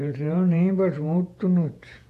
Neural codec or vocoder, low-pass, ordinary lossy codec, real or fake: vocoder, 48 kHz, 128 mel bands, Vocos; 14.4 kHz; AAC, 64 kbps; fake